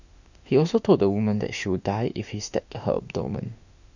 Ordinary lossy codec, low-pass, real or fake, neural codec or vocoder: none; 7.2 kHz; fake; autoencoder, 48 kHz, 32 numbers a frame, DAC-VAE, trained on Japanese speech